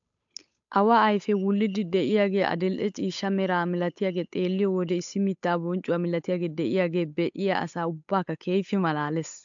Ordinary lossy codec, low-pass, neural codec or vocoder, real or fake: AAC, 64 kbps; 7.2 kHz; codec, 16 kHz, 8 kbps, FunCodec, trained on Chinese and English, 25 frames a second; fake